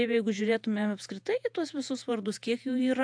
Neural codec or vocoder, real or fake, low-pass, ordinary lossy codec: vocoder, 48 kHz, 128 mel bands, Vocos; fake; 9.9 kHz; AAC, 64 kbps